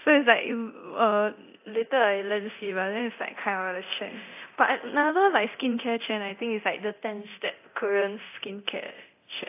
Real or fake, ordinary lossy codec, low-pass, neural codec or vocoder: fake; AAC, 32 kbps; 3.6 kHz; codec, 24 kHz, 0.9 kbps, DualCodec